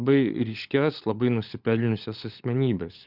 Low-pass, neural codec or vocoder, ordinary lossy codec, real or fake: 5.4 kHz; codec, 16 kHz, 16 kbps, FunCodec, trained on LibriTTS, 50 frames a second; Opus, 64 kbps; fake